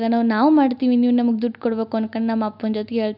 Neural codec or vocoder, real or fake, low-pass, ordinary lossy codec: none; real; 5.4 kHz; none